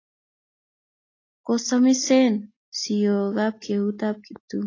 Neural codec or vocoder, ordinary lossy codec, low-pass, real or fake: none; AAC, 32 kbps; 7.2 kHz; real